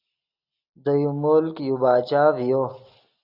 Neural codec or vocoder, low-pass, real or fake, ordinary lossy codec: none; 5.4 kHz; real; AAC, 48 kbps